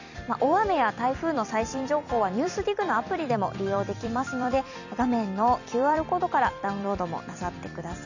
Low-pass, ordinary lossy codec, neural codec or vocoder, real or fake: 7.2 kHz; none; vocoder, 44.1 kHz, 128 mel bands every 256 samples, BigVGAN v2; fake